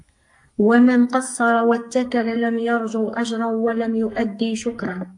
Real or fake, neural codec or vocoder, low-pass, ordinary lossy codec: fake; codec, 44.1 kHz, 2.6 kbps, SNAC; 10.8 kHz; AAC, 64 kbps